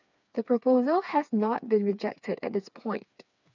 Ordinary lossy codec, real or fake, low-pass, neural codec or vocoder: none; fake; 7.2 kHz; codec, 16 kHz, 4 kbps, FreqCodec, smaller model